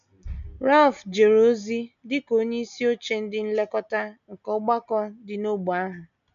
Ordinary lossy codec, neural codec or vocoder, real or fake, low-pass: none; none; real; 7.2 kHz